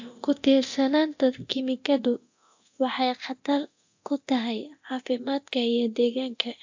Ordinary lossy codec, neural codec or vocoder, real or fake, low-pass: AAC, 48 kbps; codec, 24 kHz, 0.9 kbps, DualCodec; fake; 7.2 kHz